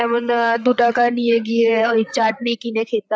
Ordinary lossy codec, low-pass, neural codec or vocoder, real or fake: none; none; codec, 16 kHz, 8 kbps, FreqCodec, larger model; fake